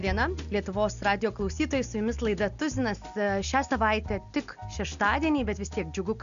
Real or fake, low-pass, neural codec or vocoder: real; 7.2 kHz; none